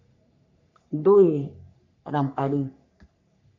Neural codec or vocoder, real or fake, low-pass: codec, 44.1 kHz, 3.4 kbps, Pupu-Codec; fake; 7.2 kHz